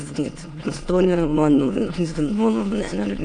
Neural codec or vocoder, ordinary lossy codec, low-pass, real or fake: autoencoder, 22.05 kHz, a latent of 192 numbers a frame, VITS, trained on many speakers; AAC, 48 kbps; 9.9 kHz; fake